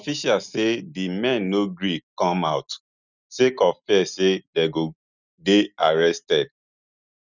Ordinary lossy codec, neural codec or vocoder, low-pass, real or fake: none; none; 7.2 kHz; real